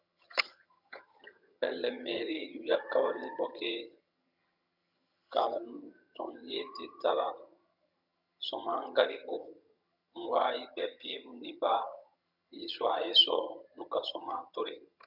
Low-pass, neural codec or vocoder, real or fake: 5.4 kHz; vocoder, 22.05 kHz, 80 mel bands, HiFi-GAN; fake